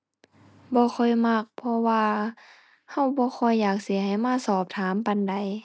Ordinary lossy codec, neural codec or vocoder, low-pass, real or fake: none; none; none; real